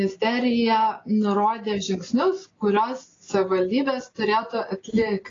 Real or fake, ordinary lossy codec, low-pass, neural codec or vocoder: real; AAC, 32 kbps; 7.2 kHz; none